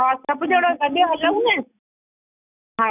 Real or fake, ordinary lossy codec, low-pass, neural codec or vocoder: real; none; 3.6 kHz; none